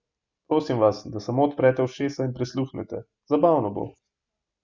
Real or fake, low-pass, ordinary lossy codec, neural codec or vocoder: real; 7.2 kHz; Opus, 64 kbps; none